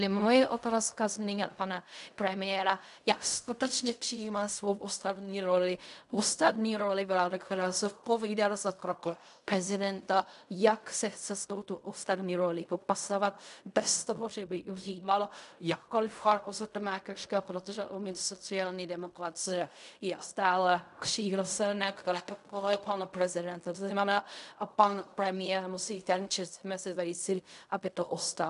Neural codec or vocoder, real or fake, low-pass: codec, 16 kHz in and 24 kHz out, 0.4 kbps, LongCat-Audio-Codec, fine tuned four codebook decoder; fake; 10.8 kHz